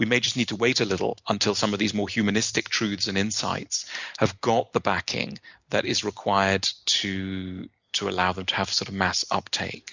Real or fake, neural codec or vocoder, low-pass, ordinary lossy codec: real; none; 7.2 kHz; Opus, 64 kbps